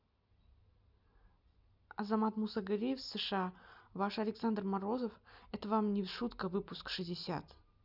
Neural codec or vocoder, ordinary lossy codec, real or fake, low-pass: none; none; real; 5.4 kHz